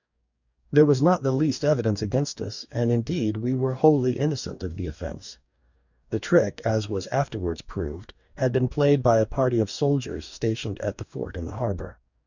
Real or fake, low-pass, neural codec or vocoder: fake; 7.2 kHz; codec, 44.1 kHz, 2.6 kbps, DAC